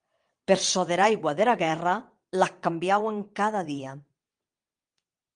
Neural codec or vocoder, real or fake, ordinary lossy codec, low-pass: vocoder, 22.05 kHz, 80 mel bands, WaveNeXt; fake; Opus, 32 kbps; 9.9 kHz